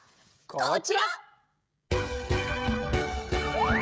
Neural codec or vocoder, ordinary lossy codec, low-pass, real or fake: codec, 16 kHz, 16 kbps, FreqCodec, smaller model; none; none; fake